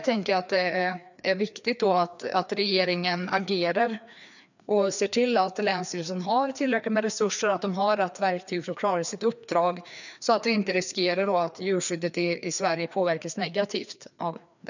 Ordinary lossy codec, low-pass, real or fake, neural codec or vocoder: none; 7.2 kHz; fake; codec, 16 kHz, 2 kbps, FreqCodec, larger model